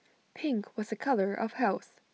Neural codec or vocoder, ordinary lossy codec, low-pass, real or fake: none; none; none; real